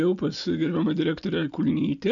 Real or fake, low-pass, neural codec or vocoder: real; 7.2 kHz; none